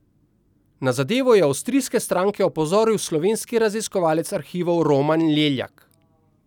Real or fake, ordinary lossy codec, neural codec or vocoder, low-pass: real; none; none; 19.8 kHz